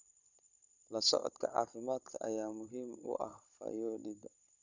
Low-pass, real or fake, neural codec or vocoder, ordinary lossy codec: 7.2 kHz; fake; codec, 16 kHz, 16 kbps, FunCodec, trained on Chinese and English, 50 frames a second; none